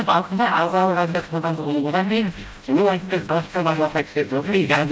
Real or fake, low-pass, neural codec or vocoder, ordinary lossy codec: fake; none; codec, 16 kHz, 0.5 kbps, FreqCodec, smaller model; none